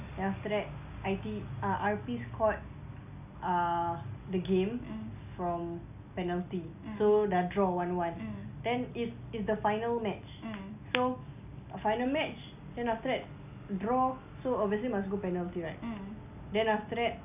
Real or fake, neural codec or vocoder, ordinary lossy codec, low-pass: real; none; none; 3.6 kHz